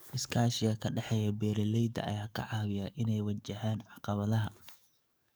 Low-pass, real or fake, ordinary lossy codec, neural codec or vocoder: none; fake; none; codec, 44.1 kHz, 7.8 kbps, DAC